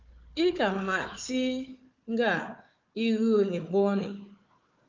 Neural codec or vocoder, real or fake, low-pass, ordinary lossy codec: codec, 16 kHz, 4 kbps, FunCodec, trained on Chinese and English, 50 frames a second; fake; 7.2 kHz; Opus, 32 kbps